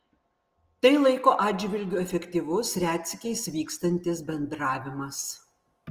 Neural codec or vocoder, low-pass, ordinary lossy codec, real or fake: none; 14.4 kHz; Opus, 24 kbps; real